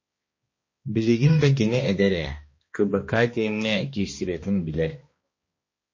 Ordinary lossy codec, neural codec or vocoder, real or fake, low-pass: MP3, 32 kbps; codec, 16 kHz, 1 kbps, X-Codec, HuBERT features, trained on balanced general audio; fake; 7.2 kHz